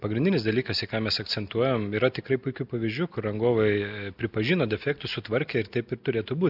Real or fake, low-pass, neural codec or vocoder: real; 5.4 kHz; none